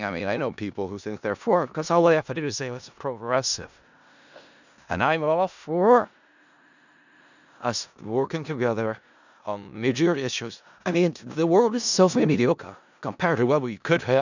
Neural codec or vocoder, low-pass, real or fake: codec, 16 kHz in and 24 kHz out, 0.4 kbps, LongCat-Audio-Codec, four codebook decoder; 7.2 kHz; fake